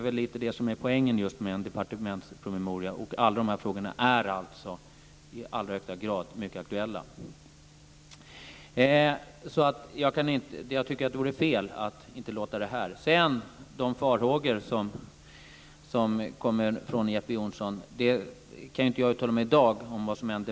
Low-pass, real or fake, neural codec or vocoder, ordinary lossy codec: none; real; none; none